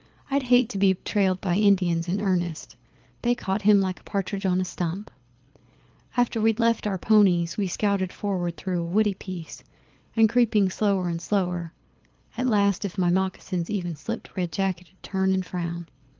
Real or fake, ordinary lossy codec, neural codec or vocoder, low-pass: fake; Opus, 24 kbps; codec, 24 kHz, 6 kbps, HILCodec; 7.2 kHz